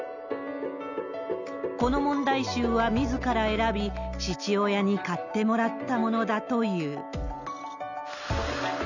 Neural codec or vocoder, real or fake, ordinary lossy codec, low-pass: none; real; none; 7.2 kHz